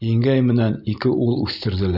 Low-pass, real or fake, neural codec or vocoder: 5.4 kHz; real; none